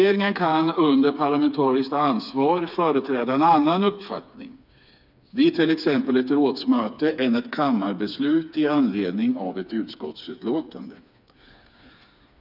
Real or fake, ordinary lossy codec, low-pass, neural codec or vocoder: fake; none; 5.4 kHz; codec, 16 kHz, 4 kbps, FreqCodec, smaller model